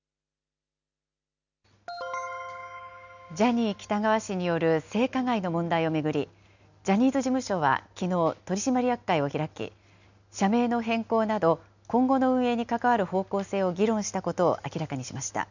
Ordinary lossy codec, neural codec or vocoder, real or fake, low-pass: none; none; real; 7.2 kHz